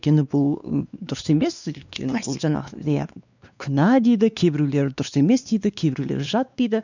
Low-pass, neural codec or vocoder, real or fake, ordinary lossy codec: 7.2 kHz; codec, 16 kHz, 2 kbps, X-Codec, WavLM features, trained on Multilingual LibriSpeech; fake; none